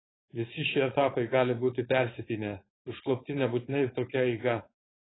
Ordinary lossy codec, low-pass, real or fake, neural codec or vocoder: AAC, 16 kbps; 7.2 kHz; fake; codec, 16 kHz, 4.8 kbps, FACodec